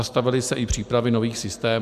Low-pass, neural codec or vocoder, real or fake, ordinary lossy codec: 14.4 kHz; none; real; Opus, 64 kbps